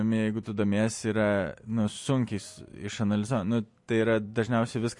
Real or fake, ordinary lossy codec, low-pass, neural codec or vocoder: real; MP3, 48 kbps; 10.8 kHz; none